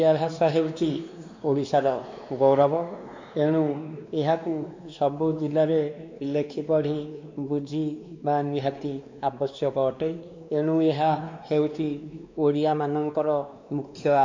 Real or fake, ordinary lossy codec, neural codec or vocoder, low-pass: fake; MP3, 64 kbps; codec, 16 kHz, 2 kbps, X-Codec, WavLM features, trained on Multilingual LibriSpeech; 7.2 kHz